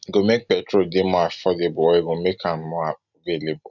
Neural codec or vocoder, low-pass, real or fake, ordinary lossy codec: none; 7.2 kHz; real; none